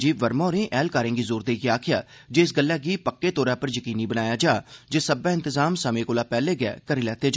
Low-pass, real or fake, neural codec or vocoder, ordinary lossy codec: none; real; none; none